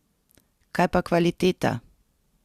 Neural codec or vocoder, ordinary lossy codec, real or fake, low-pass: none; Opus, 64 kbps; real; 14.4 kHz